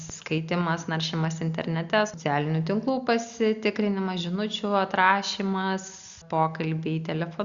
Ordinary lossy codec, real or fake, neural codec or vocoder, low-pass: Opus, 64 kbps; real; none; 7.2 kHz